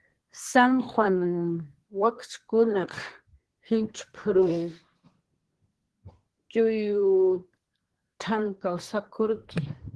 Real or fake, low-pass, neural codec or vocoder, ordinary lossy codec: fake; 10.8 kHz; codec, 24 kHz, 1 kbps, SNAC; Opus, 16 kbps